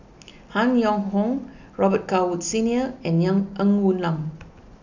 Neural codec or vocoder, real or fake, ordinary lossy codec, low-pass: none; real; none; 7.2 kHz